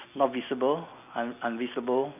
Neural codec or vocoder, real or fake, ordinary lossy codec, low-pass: none; real; none; 3.6 kHz